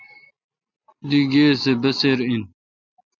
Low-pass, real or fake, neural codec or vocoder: 7.2 kHz; real; none